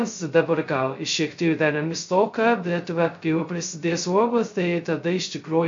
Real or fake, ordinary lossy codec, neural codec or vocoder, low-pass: fake; MP3, 48 kbps; codec, 16 kHz, 0.2 kbps, FocalCodec; 7.2 kHz